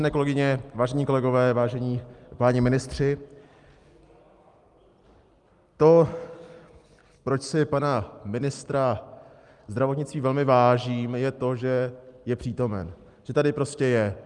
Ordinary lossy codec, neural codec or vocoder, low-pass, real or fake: Opus, 32 kbps; none; 10.8 kHz; real